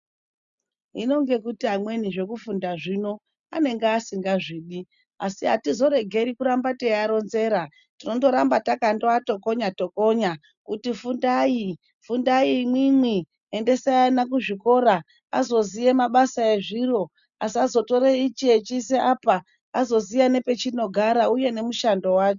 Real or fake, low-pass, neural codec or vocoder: real; 7.2 kHz; none